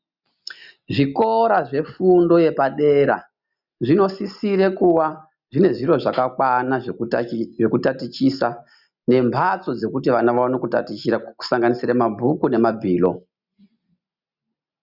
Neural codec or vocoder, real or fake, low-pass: none; real; 5.4 kHz